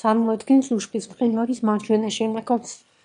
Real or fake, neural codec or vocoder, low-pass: fake; autoencoder, 22.05 kHz, a latent of 192 numbers a frame, VITS, trained on one speaker; 9.9 kHz